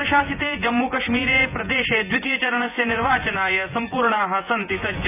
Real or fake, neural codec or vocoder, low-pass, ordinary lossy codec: fake; vocoder, 24 kHz, 100 mel bands, Vocos; 3.6 kHz; none